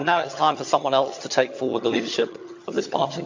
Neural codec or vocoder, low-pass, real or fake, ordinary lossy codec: vocoder, 22.05 kHz, 80 mel bands, HiFi-GAN; 7.2 kHz; fake; MP3, 48 kbps